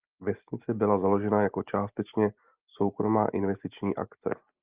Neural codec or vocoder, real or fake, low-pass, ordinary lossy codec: none; real; 3.6 kHz; Opus, 24 kbps